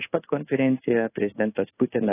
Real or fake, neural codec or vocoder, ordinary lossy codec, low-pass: fake; codec, 16 kHz in and 24 kHz out, 1 kbps, XY-Tokenizer; AAC, 16 kbps; 3.6 kHz